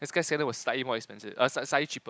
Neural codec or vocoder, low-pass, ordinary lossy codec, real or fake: none; none; none; real